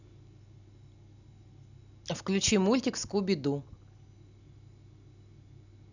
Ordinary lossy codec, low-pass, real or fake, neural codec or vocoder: none; 7.2 kHz; real; none